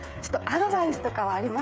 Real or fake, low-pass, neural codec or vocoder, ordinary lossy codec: fake; none; codec, 16 kHz, 8 kbps, FreqCodec, smaller model; none